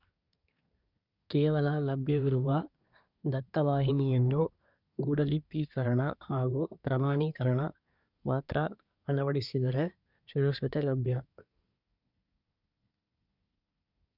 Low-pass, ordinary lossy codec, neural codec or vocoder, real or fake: 5.4 kHz; none; codec, 24 kHz, 1 kbps, SNAC; fake